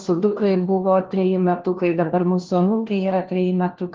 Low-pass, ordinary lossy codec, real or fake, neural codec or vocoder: 7.2 kHz; Opus, 16 kbps; fake; codec, 16 kHz, 1 kbps, FunCodec, trained on LibriTTS, 50 frames a second